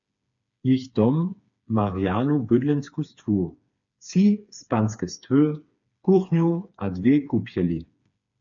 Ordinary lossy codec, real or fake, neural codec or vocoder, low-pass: MP3, 64 kbps; fake; codec, 16 kHz, 4 kbps, FreqCodec, smaller model; 7.2 kHz